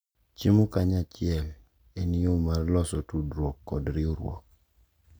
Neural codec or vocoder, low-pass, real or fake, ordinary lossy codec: none; none; real; none